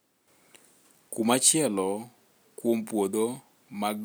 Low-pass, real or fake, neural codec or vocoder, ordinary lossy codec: none; real; none; none